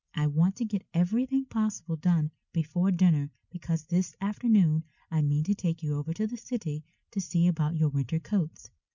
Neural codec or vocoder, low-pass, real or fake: none; 7.2 kHz; real